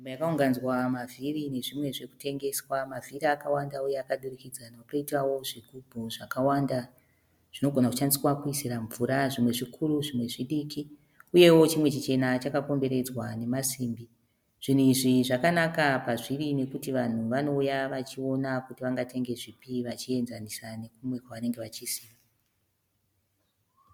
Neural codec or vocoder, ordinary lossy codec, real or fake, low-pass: none; MP3, 96 kbps; real; 19.8 kHz